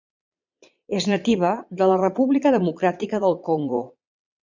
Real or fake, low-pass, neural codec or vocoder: fake; 7.2 kHz; vocoder, 22.05 kHz, 80 mel bands, Vocos